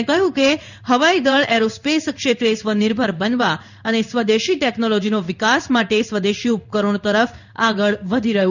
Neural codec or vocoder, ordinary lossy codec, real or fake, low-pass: codec, 16 kHz in and 24 kHz out, 1 kbps, XY-Tokenizer; none; fake; 7.2 kHz